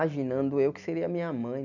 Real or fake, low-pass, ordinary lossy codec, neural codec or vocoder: real; 7.2 kHz; none; none